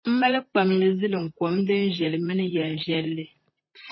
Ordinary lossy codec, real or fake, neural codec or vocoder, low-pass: MP3, 24 kbps; fake; vocoder, 44.1 kHz, 128 mel bands, Pupu-Vocoder; 7.2 kHz